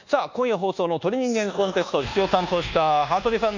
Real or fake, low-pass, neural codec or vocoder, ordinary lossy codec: fake; 7.2 kHz; codec, 24 kHz, 1.2 kbps, DualCodec; none